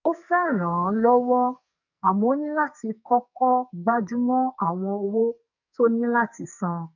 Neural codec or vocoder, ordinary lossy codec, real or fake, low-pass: codec, 44.1 kHz, 2.6 kbps, SNAC; none; fake; 7.2 kHz